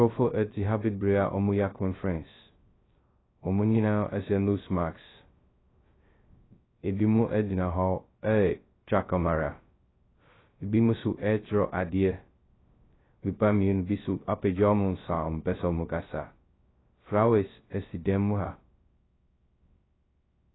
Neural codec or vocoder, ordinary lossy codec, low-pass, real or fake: codec, 16 kHz, 0.2 kbps, FocalCodec; AAC, 16 kbps; 7.2 kHz; fake